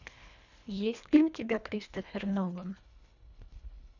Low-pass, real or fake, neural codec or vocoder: 7.2 kHz; fake; codec, 24 kHz, 1.5 kbps, HILCodec